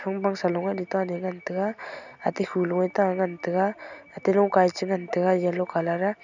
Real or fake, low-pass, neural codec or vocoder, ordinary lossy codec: real; 7.2 kHz; none; none